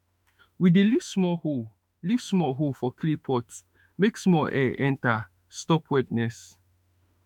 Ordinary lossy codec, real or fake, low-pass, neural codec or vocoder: none; fake; 19.8 kHz; autoencoder, 48 kHz, 32 numbers a frame, DAC-VAE, trained on Japanese speech